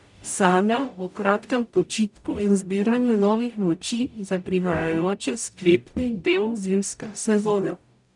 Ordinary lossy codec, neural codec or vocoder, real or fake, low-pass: none; codec, 44.1 kHz, 0.9 kbps, DAC; fake; 10.8 kHz